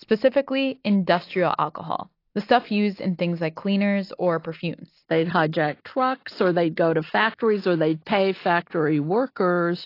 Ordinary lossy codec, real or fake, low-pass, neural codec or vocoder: AAC, 32 kbps; real; 5.4 kHz; none